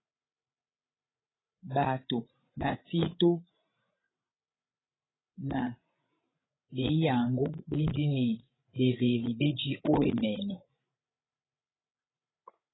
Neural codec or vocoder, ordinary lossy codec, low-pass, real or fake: codec, 16 kHz, 16 kbps, FreqCodec, larger model; AAC, 16 kbps; 7.2 kHz; fake